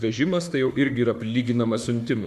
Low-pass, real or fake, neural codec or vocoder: 14.4 kHz; fake; autoencoder, 48 kHz, 32 numbers a frame, DAC-VAE, trained on Japanese speech